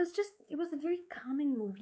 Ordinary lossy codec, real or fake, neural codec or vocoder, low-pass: none; fake; codec, 16 kHz, 4 kbps, X-Codec, WavLM features, trained on Multilingual LibriSpeech; none